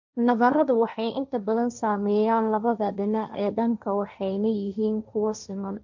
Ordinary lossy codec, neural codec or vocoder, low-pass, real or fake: none; codec, 16 kHz, 1.1 kbps, Voila-Tokenizer; 7.2 kHz; fake